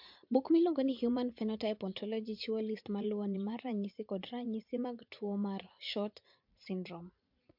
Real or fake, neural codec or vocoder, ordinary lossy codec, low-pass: fake; vocoder, 44.1 kHz, 80 mel bands, Vocos; MP3, 48 kbps; 5.4 kHz